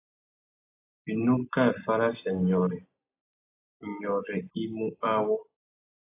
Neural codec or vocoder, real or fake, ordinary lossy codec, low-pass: none; real; AAC, 24 kbps; 3.6 kHz